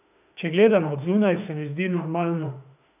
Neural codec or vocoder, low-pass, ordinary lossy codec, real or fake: autoencoder, 48 kHz, 32 numbers a frame, DAC-VAE, trained on Japanese speech; 3.6 kHz; none; fake